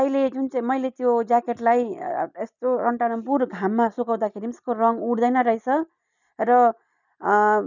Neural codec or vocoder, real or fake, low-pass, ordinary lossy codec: none; real; 7.2 kHz; none